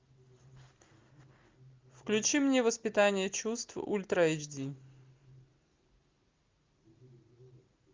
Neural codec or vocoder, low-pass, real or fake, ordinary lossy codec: none; 7.2 kHz; real; Opus, 24 kbps